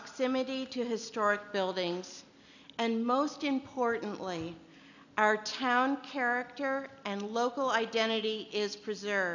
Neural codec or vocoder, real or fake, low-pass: none; real; 7.2 kHz